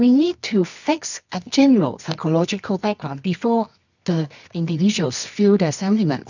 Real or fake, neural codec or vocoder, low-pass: fake; codec, 24 kHz, 0.9 kbps, WavTokenizer, medium music audio release; 7.2 kHz